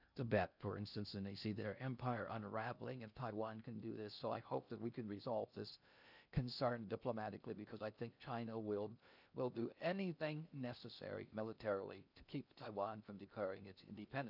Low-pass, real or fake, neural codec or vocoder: 5.4 kHz; fake; codec, 16 kHz in and 24 kHz out, 0.6 kbps, FocalCodec, streaming, 2048 codes